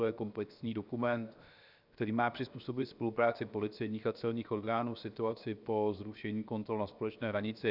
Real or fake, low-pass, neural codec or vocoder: fake; 5.4 kHz; codec, 16 kHz, 0.7 kbps, FocalCodec